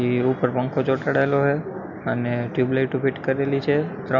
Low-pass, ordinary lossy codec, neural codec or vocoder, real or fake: 7.2 kHz; none; none; real